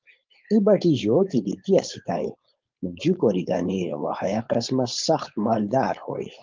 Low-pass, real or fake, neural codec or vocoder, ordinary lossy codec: 7.2 kHz; fake; codec, 16 kHz, 4.8 kbps, FACodec; Opus, 32 kbps